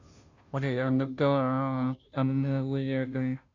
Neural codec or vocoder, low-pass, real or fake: codec, 16 kHz, 0.5 kbps, FunCodec, trained on Chinese and English, 25 frames a second; 7.2 kHz; fake